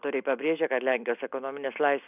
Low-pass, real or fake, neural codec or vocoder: 3.6 kHz; real; none